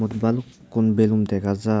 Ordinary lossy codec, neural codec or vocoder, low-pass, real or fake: none; none; none; real